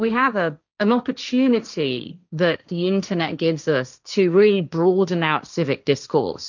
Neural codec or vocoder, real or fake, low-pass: codec, 16 kHz, 1.1 kbps, Voila-Tokenizer; fake; 7.2 kHz